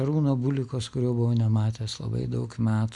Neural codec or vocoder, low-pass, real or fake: none; 10.8 kHz; real